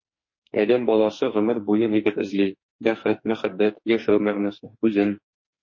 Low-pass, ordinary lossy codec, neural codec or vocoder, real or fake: 7.2 kHz; MP3, 32 kbps; codec, 44.1 kHz, 2.6 kbps, SNAC; fake